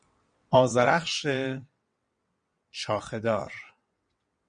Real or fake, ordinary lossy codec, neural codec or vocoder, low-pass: fake; MP3, 48 kbps; vocoder, 22.05 kHz, 80 mel bands, WaveNeXt; 9.9 kHz